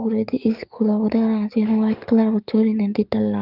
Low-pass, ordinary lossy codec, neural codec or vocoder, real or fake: 5.4 kHz; Opus, 24 kbps; codec, 44.1 kHz, 7.8 kbps, DAC; fake